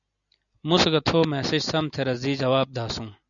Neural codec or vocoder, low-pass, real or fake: none; 7.2 kHz; real